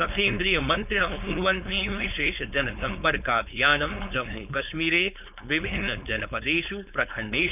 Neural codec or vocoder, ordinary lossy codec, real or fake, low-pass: codec, 16 kHz, 4.8 kbps, FACodec; none; fake; 3.6 kHz